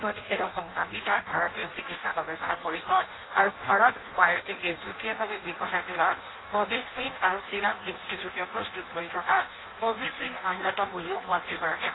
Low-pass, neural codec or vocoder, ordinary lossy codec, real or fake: 7.2 kHz; codec, 16 kHz in and 24 kHz out, 0.6 kbps, FireRedTTS-2 codec; AAC, 16 kbps; fake